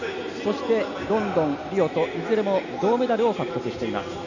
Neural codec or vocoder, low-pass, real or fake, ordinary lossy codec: none; 7.2 kHz; real; none